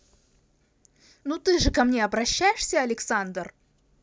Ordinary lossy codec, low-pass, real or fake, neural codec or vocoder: none; none; real; none